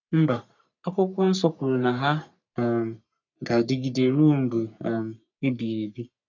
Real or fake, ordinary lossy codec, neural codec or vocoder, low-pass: fake; none; codec, 44.1 kHz, 3.4 kbps, Pupu-Codec; 7.2 kHz